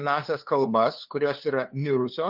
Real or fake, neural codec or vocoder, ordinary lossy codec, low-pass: fake; codec, 16 kHz, 2 kbps, FunCodec, trained on LibriTTS, 25 frames a second; Opus, 32 kbps; 5.4 kHz